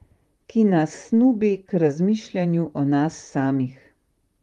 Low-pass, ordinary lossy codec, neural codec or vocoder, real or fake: 19.8 kHz; Opus, 32 kbps; vocoder, 44.1 kHz, 128 mel bands, Pupu-Vocoder; fake